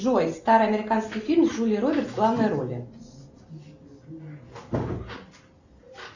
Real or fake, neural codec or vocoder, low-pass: real; none; 7.2 kHz